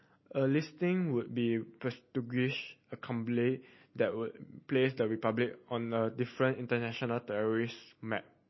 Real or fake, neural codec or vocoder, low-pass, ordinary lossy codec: real; none; 7.2 kHz; MP3, 24 kbps